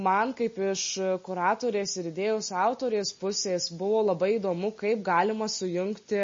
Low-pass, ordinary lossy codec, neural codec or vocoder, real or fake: 7.2 kHz; MP3, 32 kbps; none; real